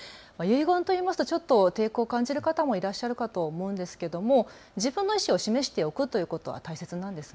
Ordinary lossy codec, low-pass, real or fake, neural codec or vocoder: none; none; real; none